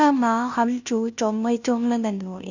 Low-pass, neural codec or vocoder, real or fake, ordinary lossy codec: 7.2 kHz; codec, 16 kHz, 0.5 kbps, FunCodec, trained on Chinese and English, 25 frames a second; fake; none